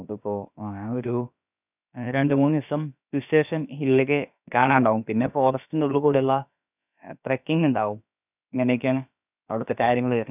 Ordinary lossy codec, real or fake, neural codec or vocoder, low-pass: none; fake; codec, 16 kHz, about 1 kbps, DyCAST, with the encoder's durations; 3.6 kHz